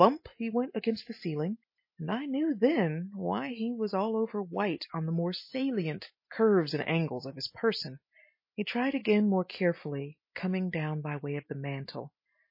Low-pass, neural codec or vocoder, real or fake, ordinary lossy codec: 5.4 kHz; none; real; MP3, 24 kbps